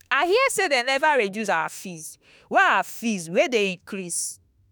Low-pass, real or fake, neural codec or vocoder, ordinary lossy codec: none; fake; autoencoder, 48 kHz, 32 numbers a frame, DAC-VAE, trained on Japanese speech; none